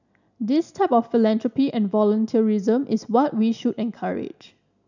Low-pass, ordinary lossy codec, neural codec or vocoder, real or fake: 7.2 kHz; none; none; real